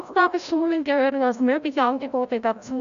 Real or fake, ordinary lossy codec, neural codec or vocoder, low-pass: fake; none; codec, 16 kHz, 0.5 kbps, FreqCodec, larger model; 7.2 kHz